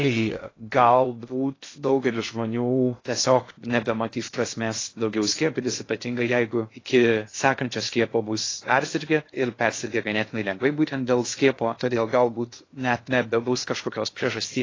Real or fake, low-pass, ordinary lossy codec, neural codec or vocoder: fake; 7.2 kHz; AAC, 32 kbps; codec, 16 kHz in and 24 kHz out, 0.6 kbps, FocalCodec, streaming, 2048 codes